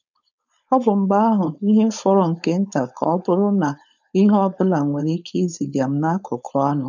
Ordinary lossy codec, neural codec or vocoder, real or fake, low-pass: none; codec, 16 kHz, 4.8 kbps, FACodec; fake; 7.2 kHz